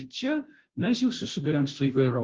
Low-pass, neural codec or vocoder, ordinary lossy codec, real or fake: 7.2 kHz; codec, 16 kHz, 0.5 kbps, FunCodec, trained on Chinese and English, 25 frames a second; Opus, 16 kbps; fake